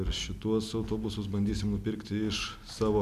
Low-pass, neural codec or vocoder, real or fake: 14.4 kHz; none; real